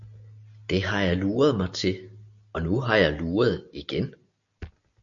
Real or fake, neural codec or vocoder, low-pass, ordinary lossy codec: real; none; 7.2 kHz; MP3, 96 kbps